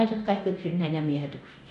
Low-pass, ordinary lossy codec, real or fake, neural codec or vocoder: 10.8 kHz; none; fake; codec, 24 kHz, 0.9 kbps, DualCodec